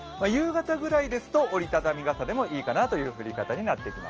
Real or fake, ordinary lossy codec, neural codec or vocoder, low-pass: real; Opus, 24 kbps; none; 7.2 kHz